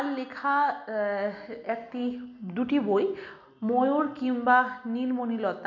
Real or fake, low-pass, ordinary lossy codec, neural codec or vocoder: real; 7.2 kHz; none; none